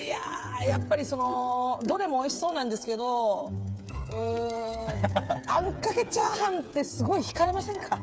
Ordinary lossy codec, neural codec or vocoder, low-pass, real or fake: none; codec, 16 kHz, 8 kbps, FreqCodec, smaller model; none; fake